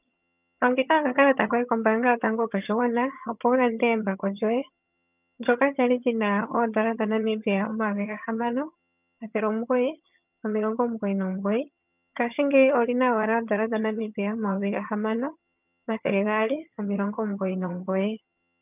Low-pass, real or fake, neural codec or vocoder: 3.6 kHz; fake; vocoder, 22.05 kHz, 80 mel bands, HiFi-GAN